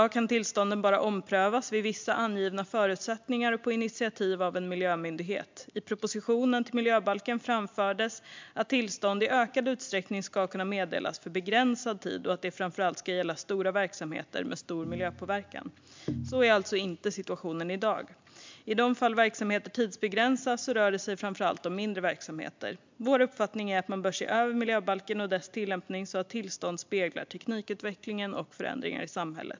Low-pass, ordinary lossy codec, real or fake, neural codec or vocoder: 7.2 kHz; MP3, 64 kbps; real; none